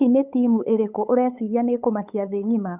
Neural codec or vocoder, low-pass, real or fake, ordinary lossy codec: codec, 16 kHz, 8 kbps, FunCodec, trained on LibriTTS, 25 frames a second; 3.6 kHz; fake; none